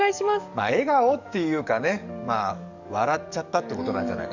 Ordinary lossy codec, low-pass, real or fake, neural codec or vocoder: none; 7.2 kHz; fake; codec, 44.1 kHz, 7.8 kbps, DAC